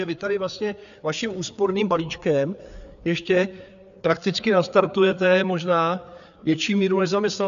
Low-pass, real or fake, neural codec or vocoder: 7.2 kHz; fake; codec, 16 kHz, 4 kbps, FreqCodec, larger model